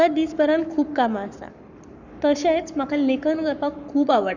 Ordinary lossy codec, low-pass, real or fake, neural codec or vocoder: none; 7.2 kHz; fake; codec, 44.1 kHz, 7.8 kbps, Pupu-Codec